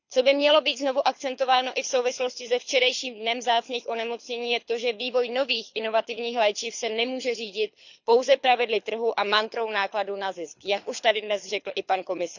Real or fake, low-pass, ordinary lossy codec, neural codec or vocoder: fake; 7.2 kHz; none; codec, 24 kHz, 6 kbps, HILCodec